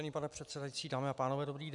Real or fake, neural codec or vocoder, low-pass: real; none; 10.8 kHz